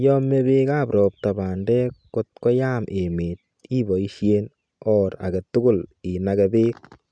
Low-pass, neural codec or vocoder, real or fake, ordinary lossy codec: 9.9 kHz; none; real; none